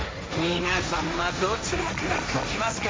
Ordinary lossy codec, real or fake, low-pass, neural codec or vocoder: none; fake; none; codec, 16 kHz, 1.1 kbps, Voila-Tokenizer